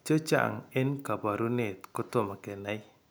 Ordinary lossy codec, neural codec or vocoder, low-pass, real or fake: none; none; none; real